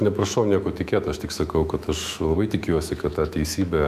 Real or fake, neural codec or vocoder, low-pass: fake; autoencoder, 48 kHz, 128 numbers a frame, DAC-VAE, trained on Japanese speech; 14.4 kHz